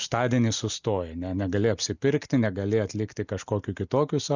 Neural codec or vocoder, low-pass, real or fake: none; 7.2 kHz; real